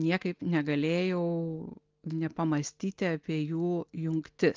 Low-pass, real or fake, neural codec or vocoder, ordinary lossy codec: 7.2 kHz; real; none; Opus, 32 kbps